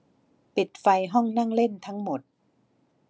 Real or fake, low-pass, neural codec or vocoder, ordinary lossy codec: real; none; none; none